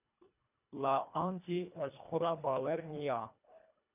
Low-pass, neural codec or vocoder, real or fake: 3.6 kHz; codec, 24 kHz, 1.5 kbps, HILCodec; fake